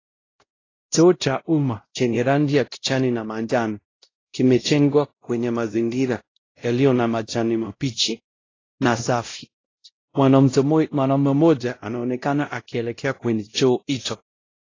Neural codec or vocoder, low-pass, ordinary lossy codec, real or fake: codec, 16 kHz, 0.5 kbps, X-Codec, WavLM features, trained on Multilingual LibriSpeech; 7.2 kHz; AAC, 32 kbps; fake